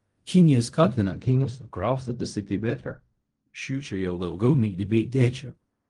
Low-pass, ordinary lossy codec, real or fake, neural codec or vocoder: 10.8 kHz; Opus, 32 kbps; fake; codec, 16 kHz in and 24 kHz out, 0.4 kbps, LongCat-Audio-Codec, fine tuned four codebook decoder